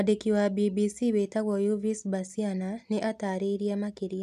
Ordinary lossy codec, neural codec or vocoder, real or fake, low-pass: none; none; real; 14.4 kHz